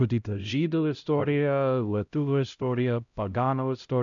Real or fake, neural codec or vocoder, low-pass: fake; codec, 16 kHz, 0.5 kbps, X-Codec, HuBERT features, trained on LibriSpeech; 7.2 kHz